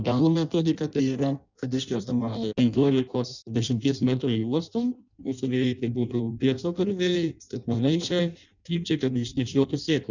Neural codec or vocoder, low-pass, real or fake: codec, 16 kHz in and 24 kHz out, 0.6 kbps, FireRedTTS-2 codec; 7.2 kHz; fake